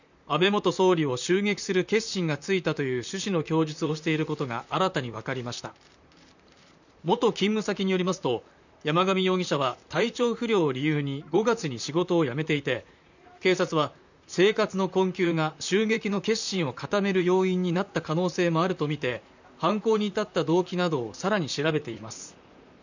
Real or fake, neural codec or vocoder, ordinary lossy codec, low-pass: fake; vocoder, 44.1 kHz, 128 mel bands, Pupu-Vocoder; none; 7.2 kHz